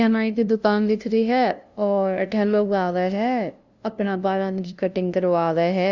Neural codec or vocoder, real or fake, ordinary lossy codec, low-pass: codec, 16 kHz, 0.5 kbps, FunCodec, trained on LibriTTS, 25 frames a second; fake; Opus, 64 kbps; 7.2 kHz